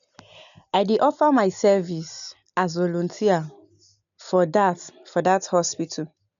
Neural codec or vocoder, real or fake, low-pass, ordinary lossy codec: none; real; 7.2 kHz; none